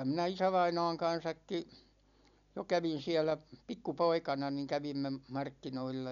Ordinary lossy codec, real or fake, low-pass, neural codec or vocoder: none; real; 7.2 kHz; none